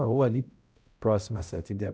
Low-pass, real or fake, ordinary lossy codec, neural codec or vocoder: none; fake; none; codec, 16 kHz, 0.5 kbps, X-Codec, HuBERT features, trained on balanced general audio